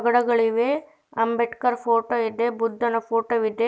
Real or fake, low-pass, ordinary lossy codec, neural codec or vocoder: real; none; none; none